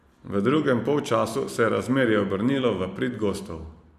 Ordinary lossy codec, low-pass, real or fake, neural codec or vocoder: none; 14.4 kHz; fake; vocoder, 44.1 kHz, 128 mel bands every 512 samples, BigVGAN v2